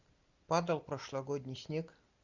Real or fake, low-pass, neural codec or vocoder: real; 7.2 kHz; none